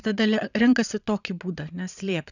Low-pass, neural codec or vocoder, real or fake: 7.2 kHz; vocoder, 22.05 kHz, 80 mel bands, Vocos; fake